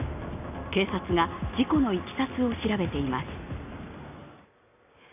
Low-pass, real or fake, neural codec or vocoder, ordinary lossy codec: 3.6 kHz; real; none; none